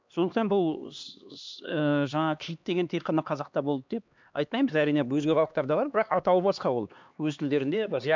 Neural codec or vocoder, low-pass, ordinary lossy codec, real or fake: codec, 16 kHz, 2 kbps, X-Codec, HuBERT features, trained on LibriSpeech; 7.2 kHz; MP3, 64 kbps; fake